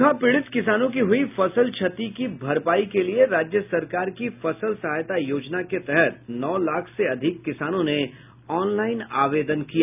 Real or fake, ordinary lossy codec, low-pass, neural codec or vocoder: real; none; 3.6 kHz; none